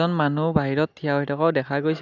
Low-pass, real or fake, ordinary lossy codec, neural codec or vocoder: 7.2 kHz; real; none; none